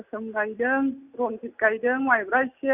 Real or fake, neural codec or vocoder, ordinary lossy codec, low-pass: real; none; AAC, 32 kbps; 3.6 kHz